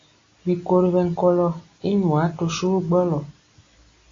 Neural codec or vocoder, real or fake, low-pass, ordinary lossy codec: none; real; 7.2 kHz; AAC, 32 kbps